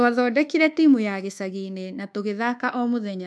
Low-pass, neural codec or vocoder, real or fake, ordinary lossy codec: none; codec, 24 kHz, 1.2 kbps, DualCodec; fake; none